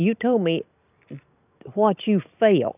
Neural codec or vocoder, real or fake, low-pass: none; real; 3.6 kHz